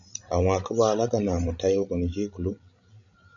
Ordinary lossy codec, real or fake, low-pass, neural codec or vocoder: MP3, 96 kbps; fake; 7.2 kHz; codec, 16 kHz, 16 kbps, FreqCodec, larger model